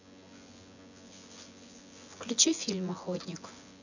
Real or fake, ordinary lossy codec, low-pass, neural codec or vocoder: fake; none; 7.2 kHz; vocoder, 24 kHz, 100 mel bands, Vocos